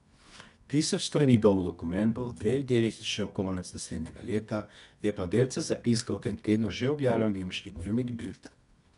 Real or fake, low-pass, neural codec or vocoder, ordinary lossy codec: fake; 10.8 kHz; codec, 24 kHz, 0.9 kbps, WavTokenizer, medium music audio release; none